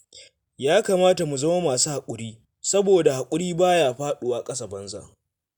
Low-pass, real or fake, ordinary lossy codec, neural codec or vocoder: none; real; none; none